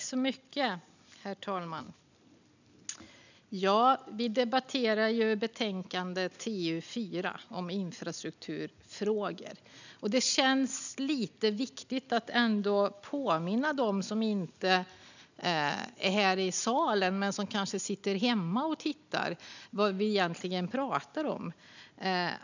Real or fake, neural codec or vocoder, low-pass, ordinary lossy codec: real; none; 7.2 kHz; none